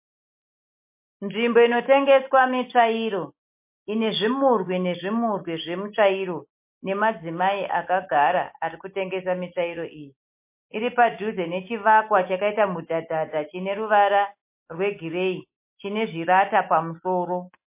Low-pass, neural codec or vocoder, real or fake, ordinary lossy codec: 3.6 kHz; none; real; MP3, 24 kbps